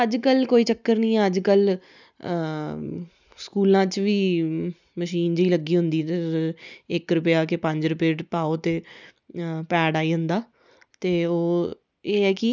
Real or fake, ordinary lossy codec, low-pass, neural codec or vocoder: real; none; 7.2 kHz; none